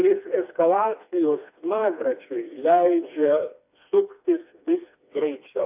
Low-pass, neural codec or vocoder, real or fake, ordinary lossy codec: 3.6 kHz; codec, 16 kHz, 2 kbps, FreqCodec, smaller model; fake; AAC, 32 kbps